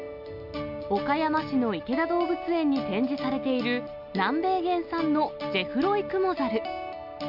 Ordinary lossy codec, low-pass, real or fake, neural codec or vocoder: none; 5.4 kHz; real; none